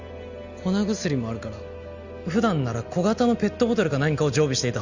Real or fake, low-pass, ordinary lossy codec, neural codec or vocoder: real; 7.2 kHz; Opus, 64 kbps; none